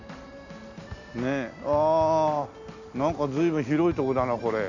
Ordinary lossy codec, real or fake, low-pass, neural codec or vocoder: none; real; 7.2 kHz; none